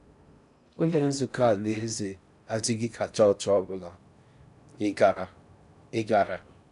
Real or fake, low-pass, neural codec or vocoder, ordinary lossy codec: fake; 10.8 kHz; codec, 16 kHz in and 24 kHz out, 0.6 kbps, FocalCodec, streaming, 4096 codes; none